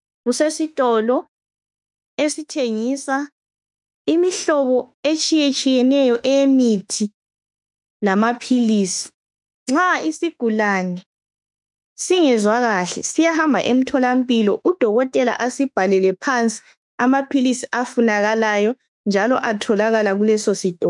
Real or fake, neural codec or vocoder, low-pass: fake; autoencoder, 48 kHz, 32 numbers a frame, DAC-VAE, trained on Japanese speech; 10.8 kHz